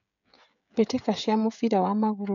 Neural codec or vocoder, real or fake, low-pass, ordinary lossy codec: codec, 16 kHz, 16 kbps, FreqCodec, smaller model; fake; 7.2 kHz; MP3, 96 kbps